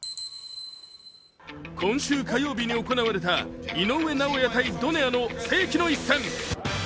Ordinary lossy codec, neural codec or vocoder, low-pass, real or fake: none; none; none; real